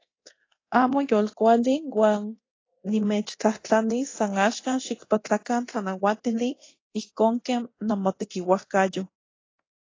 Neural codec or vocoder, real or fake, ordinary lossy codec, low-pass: codec, 24 kHz, 0.9 kbps, DualCodec; fake; AAC, 32 kbps; 7.2 kHz